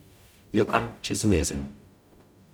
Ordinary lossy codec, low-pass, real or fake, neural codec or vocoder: none; none; fake; codec, 44.1 kHz, 0.9 kbps, DAC